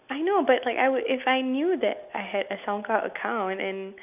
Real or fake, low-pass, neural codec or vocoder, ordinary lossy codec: real; 3.6 kHz; none; none